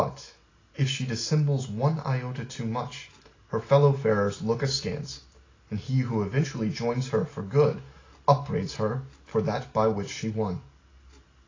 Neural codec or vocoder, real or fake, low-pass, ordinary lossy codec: none; real; 7.2 kHz; AAC, 32 kbps